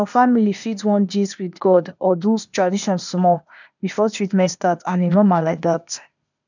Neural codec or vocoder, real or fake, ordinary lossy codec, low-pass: codec, 16 kHz, 0.8 kbps, ZipCodec; fake; none; 7.2 kHz